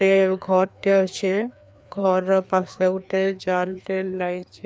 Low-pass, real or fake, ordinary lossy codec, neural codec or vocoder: none; fake; none; codec, 16 kHz, 2 kbps, FreqCodec, larger model